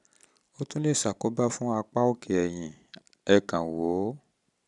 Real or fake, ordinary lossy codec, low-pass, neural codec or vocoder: real; none; 10.8 kHz; none